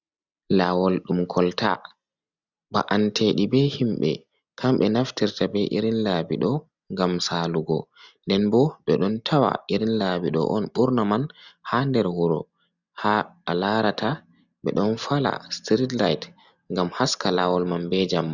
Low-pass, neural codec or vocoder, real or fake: 7.2 kHz; none; real